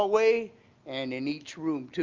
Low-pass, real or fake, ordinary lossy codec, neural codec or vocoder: 7.2 kHz; real; Opus, 24 kbps; none